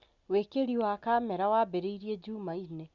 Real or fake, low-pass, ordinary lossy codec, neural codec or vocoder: real; 7.2 kHz; Opus, 64 kbps; none